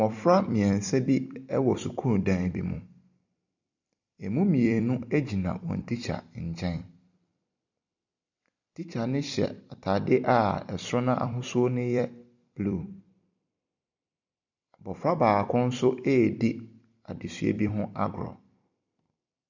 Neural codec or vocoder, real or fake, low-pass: vocoder, 44.1 kHz, 128 mel bands every 256 samples, BigVGAN v2; fake; 7.2 kHz